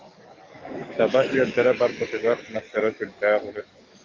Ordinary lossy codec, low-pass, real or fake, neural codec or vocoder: Opus, 24 kbps; 7.2 kHz; fake; codec, 44.1 kHz, 7.8 kbps, DAC